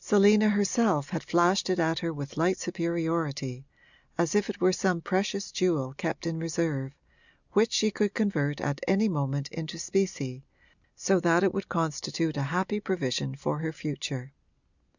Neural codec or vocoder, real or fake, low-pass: none; real; 7.2 kHz